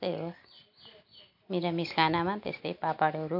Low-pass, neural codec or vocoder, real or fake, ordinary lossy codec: 5.4 kHz; none; real; MP3, 48 kbps